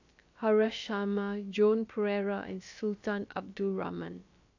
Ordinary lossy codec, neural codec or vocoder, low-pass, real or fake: AAC, 48 kbps; codec, 16 kHz, about 1 kbps, DyCAST, with the encoder's durations; 7.2 kHz; fake